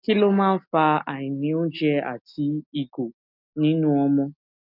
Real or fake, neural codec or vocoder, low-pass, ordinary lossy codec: real; none; 5.4 kHz; none